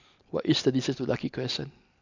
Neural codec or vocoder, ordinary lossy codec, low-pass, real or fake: none; none; 7.2 kHz; real